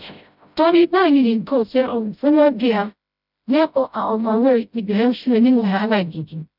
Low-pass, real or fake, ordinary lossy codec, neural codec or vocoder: 5.4 kHz; fake; none; codec, 16 kHz, 0.5 kbps, FreqCodec, smaller model